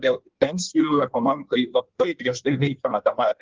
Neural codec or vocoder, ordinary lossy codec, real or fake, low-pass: codec, 16 kHz in and 24 kHz out, 1.1 kbps, FireRedTTS-2 codec; Opus, 16 kbps; fake; 7.2 kHz